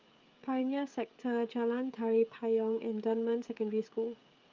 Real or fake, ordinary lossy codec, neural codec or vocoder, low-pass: fake; Opus, 24 kbps; codec, 16 kHz, 16 kbps, FreqCodec, smaller model; 7.2 kHz